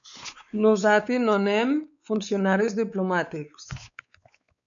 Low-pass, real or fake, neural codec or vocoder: 7.2 kHz; fake; codec, 16 kHz, 4 kbps, X-Codec, WavLM features, trained on Multilingual LibriSpeech